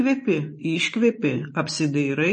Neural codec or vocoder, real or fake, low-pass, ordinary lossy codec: vocoder, 24 kHz, 100 mel bands, Vocos; fake; 10.8 kHz; MP3, 32 kbps